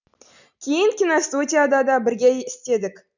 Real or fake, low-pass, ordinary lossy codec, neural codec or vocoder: real; 7.2 kHz; none; none